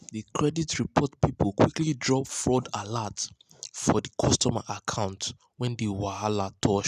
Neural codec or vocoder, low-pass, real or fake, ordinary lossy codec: none; 14.4 kHz; real; none